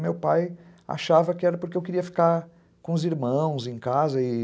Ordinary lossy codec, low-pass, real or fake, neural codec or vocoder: none; none; real; none